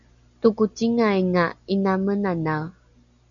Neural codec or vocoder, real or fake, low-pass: none; real; 7.2 kHz